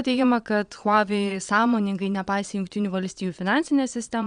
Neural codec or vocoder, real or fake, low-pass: vocoder, 22.05 kHz, 80 mel bands, Vocos; fake; 9.9 kHz